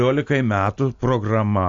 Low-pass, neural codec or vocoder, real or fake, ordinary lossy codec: 7.2 kHz; none; real; AAC, 48 kbps